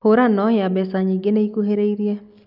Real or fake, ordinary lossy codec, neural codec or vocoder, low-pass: real; none; none; 5.4 kHz